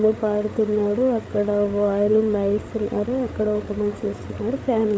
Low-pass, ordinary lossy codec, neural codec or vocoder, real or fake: none; none; codec, 16 kHz, 8 kbps, FreqCodec, larger model; fake